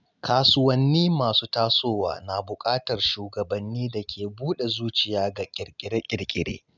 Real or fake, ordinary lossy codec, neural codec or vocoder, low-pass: real; none; none; 7.2 kHz